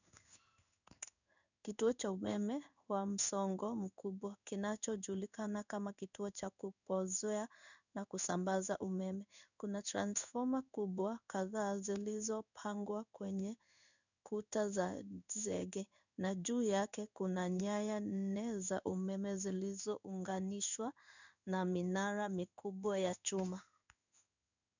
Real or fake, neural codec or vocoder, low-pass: fake; codec, 16 kHz in and 24 kHz out, 1 kbps, XY-Tokenizer; 7.2 kHz